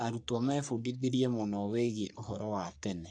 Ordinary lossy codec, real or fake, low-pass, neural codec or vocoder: none; fake; 9.9 kHz; codec, 44.1 kHz, 3.4 kbps, Pupu-Codec